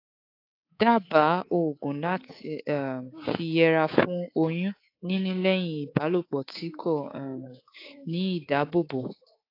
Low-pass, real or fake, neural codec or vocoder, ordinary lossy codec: 5.4 kHz; fake; codec, 24 kHz, 3.1 kbps, DualCodec; AAC, 32 kbps